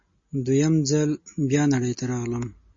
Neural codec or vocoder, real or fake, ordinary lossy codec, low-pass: none; real; MP3, 32 kbps; 7.2 kHz